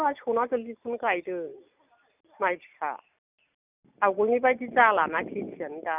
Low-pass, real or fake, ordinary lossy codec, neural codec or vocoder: 3.6 kHz; real; none; none